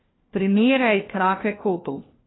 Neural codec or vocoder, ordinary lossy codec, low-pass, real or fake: codec, 16 kHz, 1 kbps, FunCodec, trained on LibriTTS, 50 frames a second; AAC, 16 kbps; 7.2 kHz; fake